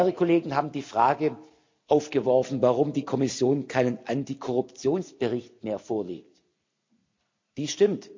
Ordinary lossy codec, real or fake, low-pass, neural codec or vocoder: MP3, 64 kbps; real; 7.2 kHz; none